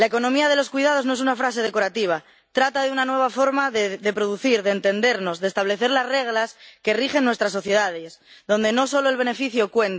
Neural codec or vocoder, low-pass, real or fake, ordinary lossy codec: none; none; real; none